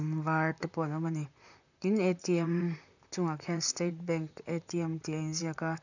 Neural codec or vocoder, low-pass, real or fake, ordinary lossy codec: vocoder, 44.1 kHz, 128 mel bands, Pupu-Vocoder; 7.2 kHz; fake; none